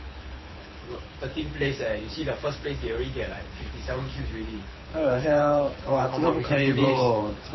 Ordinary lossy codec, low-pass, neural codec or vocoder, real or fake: MP3, 24 kbps; 7.2 kHz; codec, 24 kHz, 6 kbps, HILCodec; fake